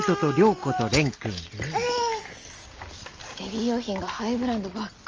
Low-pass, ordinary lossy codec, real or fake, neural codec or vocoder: 7.2 kHz; Opus, 24 kbps; real; none